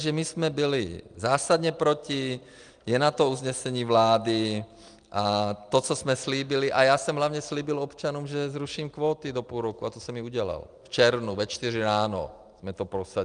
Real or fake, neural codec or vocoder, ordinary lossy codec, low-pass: real; none; Opus, 32 kbps; 9.9 kHz